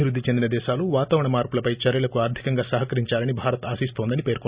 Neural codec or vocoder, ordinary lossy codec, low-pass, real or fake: none; Opus, 64 kbps; 3.6 kHz; real